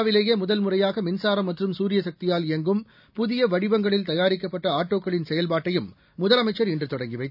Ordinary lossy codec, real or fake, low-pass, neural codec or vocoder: none; real; 5.4 kHz; none